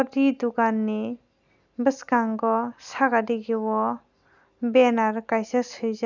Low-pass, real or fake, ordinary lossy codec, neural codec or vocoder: 7.2 kHz; real; none; none